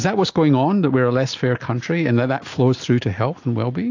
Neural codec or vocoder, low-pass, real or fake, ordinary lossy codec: none; 7.2 kHz; real; AAC, 48 kbps